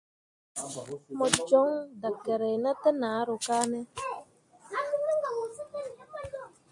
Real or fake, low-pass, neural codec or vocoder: real; 10.8 kHz; none